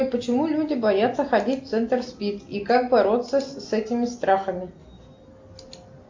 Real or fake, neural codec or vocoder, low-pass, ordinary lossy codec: real; none; 7.2 kHz; MP3, 48 kbps